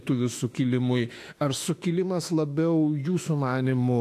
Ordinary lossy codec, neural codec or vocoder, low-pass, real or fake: AAC, 64 kbps; autoencoder, 48 kHz, 32 numbers a frame, DAC-VAE, trained on Japanese speech; 14.4 kHz; fake